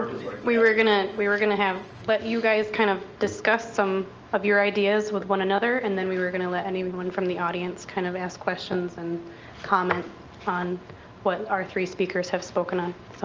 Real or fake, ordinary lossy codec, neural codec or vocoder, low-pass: real; Opus, 24 kbps; none; 7.2 kHz